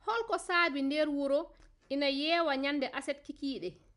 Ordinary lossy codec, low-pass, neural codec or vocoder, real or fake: none; 10.8 kHz; none; real